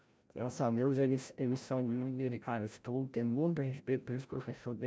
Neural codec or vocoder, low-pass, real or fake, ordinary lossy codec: codec, 16 kHz, 0.5 kbps, FreqCodec, larger model; none; fake; none